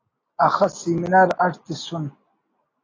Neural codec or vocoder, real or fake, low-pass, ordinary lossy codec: none; real; 7.2 kHz; AAC, 32 kbps